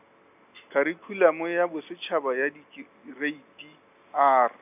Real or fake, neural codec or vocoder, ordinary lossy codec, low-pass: real; none; none; 3.6 kHz